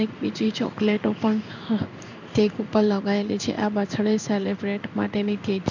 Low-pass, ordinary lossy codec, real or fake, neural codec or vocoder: 7.2 kHz; none; fake; codec, 16 kHz in and 24 kHz out, 1 kbps, XY-Tokenizer